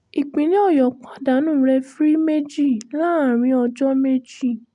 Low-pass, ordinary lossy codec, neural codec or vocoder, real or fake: 10.8 kHz; none; none; real